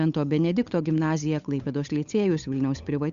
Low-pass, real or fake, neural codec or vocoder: 7.2 kHz; fake; codec, 16 kHz, 8 kbps, FunCodec, trained on Chinese and English, 25 frames a second